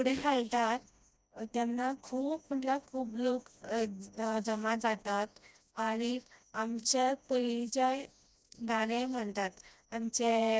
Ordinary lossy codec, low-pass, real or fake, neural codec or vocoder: none; none; fake; codec, 16 kHz, 1 kbps, FreqCodec, smaller model